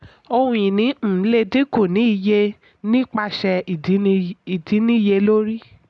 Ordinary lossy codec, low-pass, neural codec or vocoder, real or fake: none; none; none; real